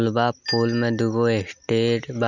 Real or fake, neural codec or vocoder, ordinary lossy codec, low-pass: real; none; none; 7.2 kHz